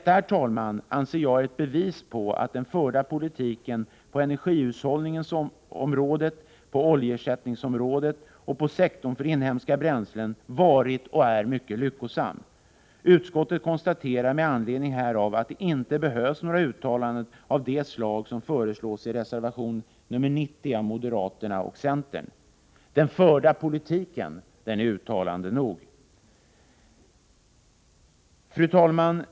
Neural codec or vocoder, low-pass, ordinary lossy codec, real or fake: none; none; none; real